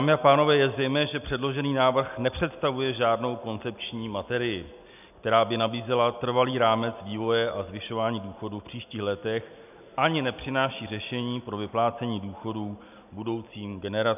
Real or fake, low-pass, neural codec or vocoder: real; 3.6 kHz; none